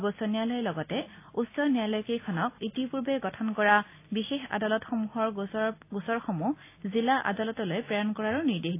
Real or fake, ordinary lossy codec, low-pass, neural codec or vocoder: real; AAC, 24 kbps; 3.6 kHz; none